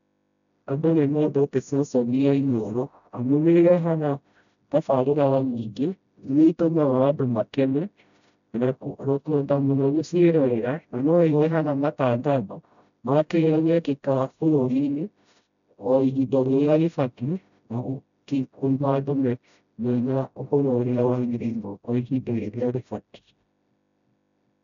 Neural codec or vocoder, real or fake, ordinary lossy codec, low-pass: codec, 16 kHz, 0.5 kbps, FreqCodec, smaller model; fake; none; 7.2 kHz